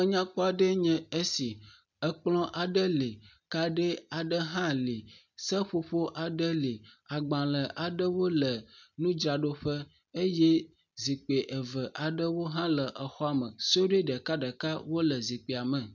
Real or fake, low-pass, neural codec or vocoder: real; 7.2 kHz; none